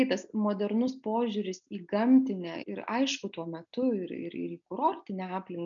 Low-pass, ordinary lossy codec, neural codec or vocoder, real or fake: 7.2 kHz; MP3, 96 kbps; none; real